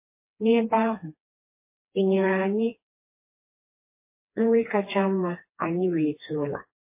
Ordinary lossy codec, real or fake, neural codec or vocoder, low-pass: MP3, 24 kbps; fake; codec, 16 kHz, 2 kbps, FreqCodec, smaller model; 3.6 kHz